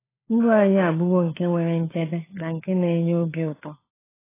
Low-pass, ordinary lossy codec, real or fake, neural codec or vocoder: 3.6 kHz; AAC, 16 kbps; fake; codec, 16 kHz, 4 kbps, FunCodec, trained on LibriTTS, 50 frames a second